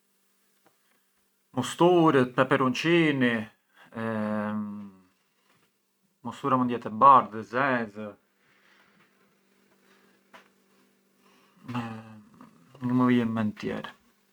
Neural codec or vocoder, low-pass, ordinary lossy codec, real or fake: none; 19.8 kHz; none; real